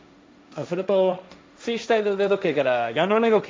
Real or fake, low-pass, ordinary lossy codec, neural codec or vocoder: fake; none; none; codec, 16 kHz, 1.1 kbps, Voila-Tokenizer